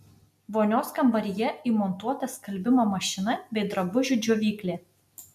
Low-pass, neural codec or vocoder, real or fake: 14.4 kHz; none; real